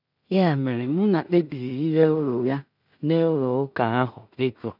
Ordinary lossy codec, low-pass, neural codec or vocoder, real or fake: none; 5.4 kHz; codec, 16 kHz in and 24 kHz out, 0.4 kbps, LongCat-Audio-Codec, two codebook decoder; fake